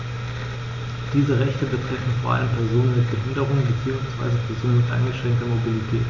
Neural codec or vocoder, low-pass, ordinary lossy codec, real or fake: none; 7.2 kHz; MP3, 64 kbps; real